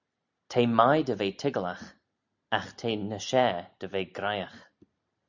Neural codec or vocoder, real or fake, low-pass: none; real; 7.2 kHz